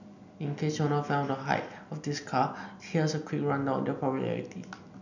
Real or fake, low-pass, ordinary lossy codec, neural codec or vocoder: real; 7.2 kHz; none; none